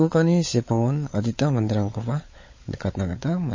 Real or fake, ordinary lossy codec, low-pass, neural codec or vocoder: fake; MP3, 32 kbps; 7.2 kHz; codec, 16 kHz, 16 kbps, FunCodec, trained on LibriTTS, 50 frames a second